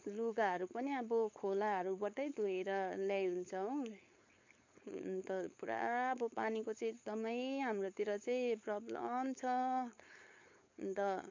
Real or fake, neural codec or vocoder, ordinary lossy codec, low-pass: fake; codec, 16 kHz, 4.8 kbps, FACodec; MP3, 48 kbps; 7.2 kHz